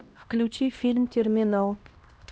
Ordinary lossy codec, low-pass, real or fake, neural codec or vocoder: none; none; fake; codec, 16 kHz, 1 kbps, X-Codec, HuBERT features, trained on LibriSpeech